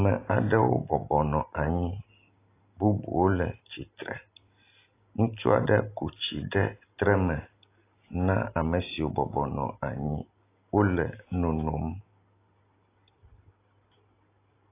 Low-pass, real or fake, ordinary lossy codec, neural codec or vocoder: 3.6 kHz; real; AAC, 24 kbps; none